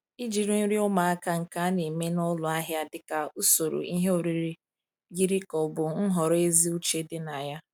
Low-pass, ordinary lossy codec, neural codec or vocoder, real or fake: none; none; none; real